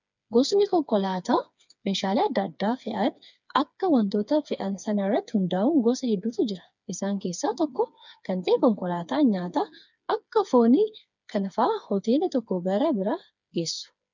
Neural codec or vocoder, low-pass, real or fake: codec, 16 kHz, 4 kbps, FreqCodec, smaller model; 7.2 kHz; fake